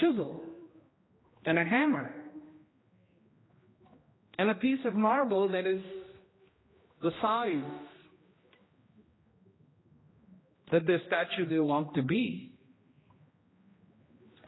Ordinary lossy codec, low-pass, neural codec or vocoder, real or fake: AAC, 16 kbps; 7.2 kHz; codec, 16 kHz, 1 kbps, X-Codec, HuBERT features, trained on general audio; fake